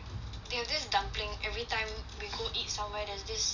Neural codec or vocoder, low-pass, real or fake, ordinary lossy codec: none; 7.2 kHz; real; none